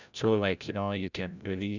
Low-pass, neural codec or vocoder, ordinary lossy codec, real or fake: 7.2 kHz; codec, 16 kHz, 0.5 kbps, FreqCodec, larger model; none; fake